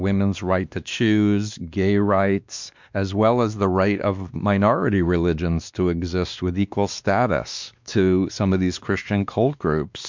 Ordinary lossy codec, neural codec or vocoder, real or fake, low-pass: MP3, 64 kbps; codec, 16 kHz, 2 kbps, X-Codec, WavLM features, trained on Multilingual LibriSpeech; fake; 7.2 kHz